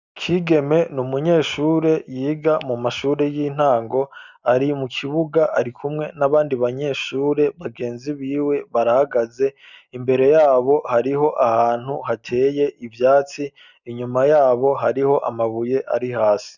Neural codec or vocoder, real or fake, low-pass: none; real; 7.2 kHz